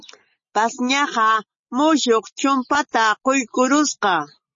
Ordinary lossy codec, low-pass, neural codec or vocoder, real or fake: MP3, 32 kbps; 7.2 kHz; none; real